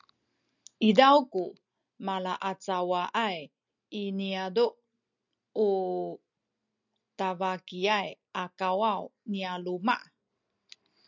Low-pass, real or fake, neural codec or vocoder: 7.2 kHz; real; none